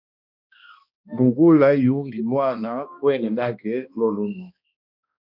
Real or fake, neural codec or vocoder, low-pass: fake; codec, 16 kHz, 1 kbps, X-Codec, HuBERT features, trained on balanced general audio; 5.4 kHz